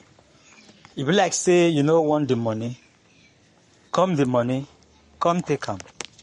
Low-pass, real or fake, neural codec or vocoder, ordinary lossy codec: 19.8 kHz; fake; codec, 44.1 kHz, 7.8 kbps, Pupu-Codec; MP3, 48 kbps